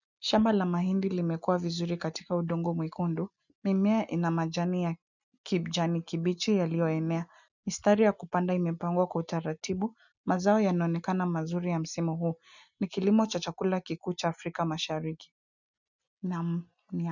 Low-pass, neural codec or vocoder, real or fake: 7.2 kHz; none; real